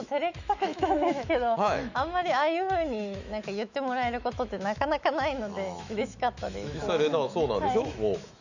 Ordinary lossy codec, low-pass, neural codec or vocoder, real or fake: none; 7.2 kHz; autoencoder, 48 kHz, 128 numbers a frame, DAC-VAE, trained on Japanese speech; fake